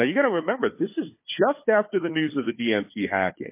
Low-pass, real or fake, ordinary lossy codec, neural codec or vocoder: 3.6 kHz; fake; MP3, 24 kbps; codec, 16 kHz, 4 kbps, FunCodec, trained on Chinese and English, 50 frames a second